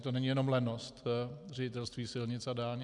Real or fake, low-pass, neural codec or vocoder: real; 10.8 kHz; none